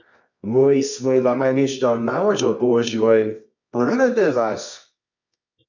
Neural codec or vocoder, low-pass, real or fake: codec, 24 kHz, 0.9 kbps, WavTokenizer, medium music audio release; 7.2 kHz; fake